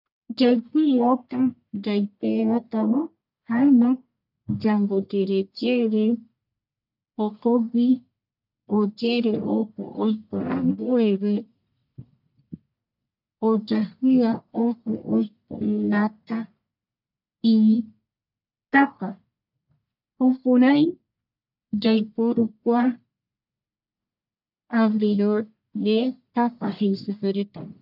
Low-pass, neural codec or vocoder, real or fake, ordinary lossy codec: 5.4 kHz; codec, 44.1 kHz, 1.7 kbps, Pupu-Codec; fake; none